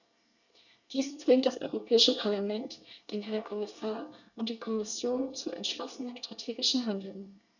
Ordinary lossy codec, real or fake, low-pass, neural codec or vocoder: none; fake; 7.2 kHz; codec, 24 kHz, 1 kbps, SNAC